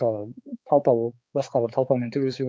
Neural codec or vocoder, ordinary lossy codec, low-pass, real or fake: codec, 16 kHz, 4 kbps, X-Codec, HuBERT features, trained on balanced general audio; none; none; fake